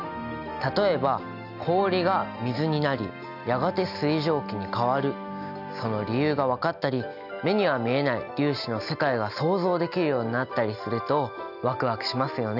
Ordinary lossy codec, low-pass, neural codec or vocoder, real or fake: none; 5.4 kHz; none; real